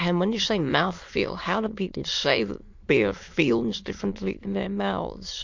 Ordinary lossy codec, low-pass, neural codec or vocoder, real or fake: MP3, 48 kbps; 7.2 kHz; autoencoder, 22.05 kHz, a latent of 192 numbers a frame, VITS, trained on many speakers; fake